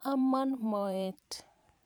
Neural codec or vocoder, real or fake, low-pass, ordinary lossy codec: vocoder, 44.1 kHz, 128 mel bands every 512 samples, BigVGAN v2; fake; none; none